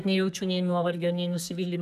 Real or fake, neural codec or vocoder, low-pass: fake; codec, 32 kHz, 1.9 kbps, SNAC; 14.4 kHz